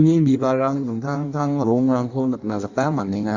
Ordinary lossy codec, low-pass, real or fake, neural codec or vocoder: Opus, 64 kbps; 7.2 kHz; fake; codec, 16 kHz in and 24 kHz out, 1.1 kbps, FireRedTTS-2 codec